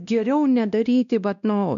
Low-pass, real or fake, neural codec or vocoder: 7.2 kHz; fake; codec, 16 kHz, 1 kbps, X-Codec, WavLM features, trained on Multilingual LibriSpeech